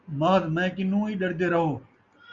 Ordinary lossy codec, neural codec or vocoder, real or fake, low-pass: Opus, 64 kbps; none; real; 7.2 kHz